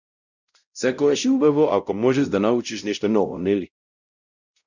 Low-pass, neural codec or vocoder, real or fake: 7.2 kHz; codec, 16 kHz, 0.5 kbps, X-Codec, WavLM features, trained on Multilingual LibriSpeech; fake